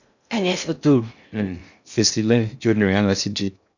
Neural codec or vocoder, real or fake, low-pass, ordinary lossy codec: codec, 16 kHz in and 24 kHz out, 0.6 kbps, FocalCodec, streaming, 2048 codes; fake; 7.2 kHz; none